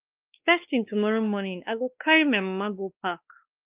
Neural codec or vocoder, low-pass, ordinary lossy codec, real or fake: codec, 16 kHz, 2 kbps, X-Codec, WavLM features, trained on Multilingual LibriSpeech; 3.6 kHz; Opus, 32 kbps; fake